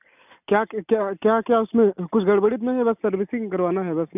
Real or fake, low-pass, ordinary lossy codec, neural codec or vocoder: real; 3.6 kHz; none; none